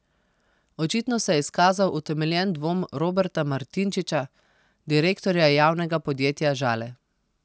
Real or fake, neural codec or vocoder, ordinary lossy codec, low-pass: real; none; none; none